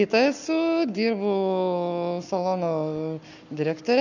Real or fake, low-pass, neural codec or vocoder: fake; 7.2 kHz; codec, 44.1 kHz, 7.8 kbps, Pupu-Codec